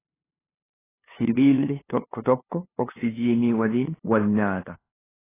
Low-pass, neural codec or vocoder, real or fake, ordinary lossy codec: 3.6 kHz; codec, 16 kHz, 2 kbps, FunCodec, trained on LibriTTS, 25 frames a second; fake; AAC, 16 kbps